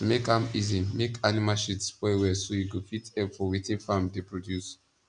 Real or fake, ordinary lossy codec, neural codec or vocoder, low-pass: real; none; none; 9.9 kHz